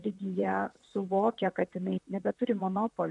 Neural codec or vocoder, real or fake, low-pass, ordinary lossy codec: vocoder, 44.1 kHz, 128 mel bands, Pupu-Vocoder; fake; 10.8 kHz; AAC, 64 kbps